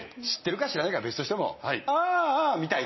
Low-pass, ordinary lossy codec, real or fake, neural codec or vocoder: 7.2 kHz; MP3, 24 kbps; real; none